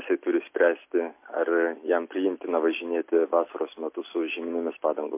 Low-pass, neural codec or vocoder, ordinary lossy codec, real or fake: 3.6 kHz; none; MP3, 24 kbps; real